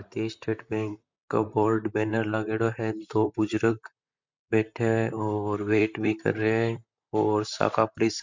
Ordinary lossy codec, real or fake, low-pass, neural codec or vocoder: MP3, 64 kbps; fake; 7.2 kHz; vocoder, 44.1 kHz, 128 mel bands, Pupu-Vocoder